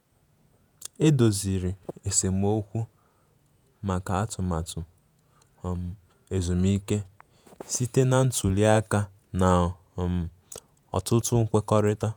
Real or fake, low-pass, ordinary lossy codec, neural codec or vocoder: fake; none; none; vocoder, 48 kHz, 128 mel bands, Vocos